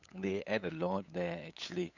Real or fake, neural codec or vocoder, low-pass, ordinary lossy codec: fake; codec, 16 kHz, 8 kbps, FunCodec, trained on LibriTTS, 25 frames a second; 7.2 kHz; none